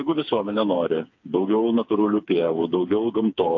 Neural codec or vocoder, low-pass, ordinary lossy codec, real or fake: codec, 16 kHz, 4 kbps, FreqCodec, smaller model; 7.2 kHz; AAC, 48 kbps; fake